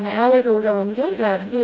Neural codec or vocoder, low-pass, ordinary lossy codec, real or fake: codec, 16 kHz, 0.5 kbps, FreqCodec, smaller model; none; none; fake